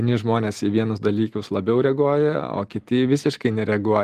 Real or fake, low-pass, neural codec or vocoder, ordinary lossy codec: real; 14.4 kHz; none; Opus, 24 kbps